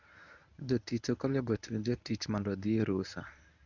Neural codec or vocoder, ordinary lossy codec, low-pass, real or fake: codec, 24 kHz, 0.9 kbps, WavTokenizer, medium speech release version 1; none; 7.2 kHz; fake